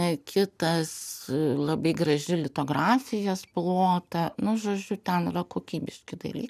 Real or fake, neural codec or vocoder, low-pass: real; none; 14.4 kHz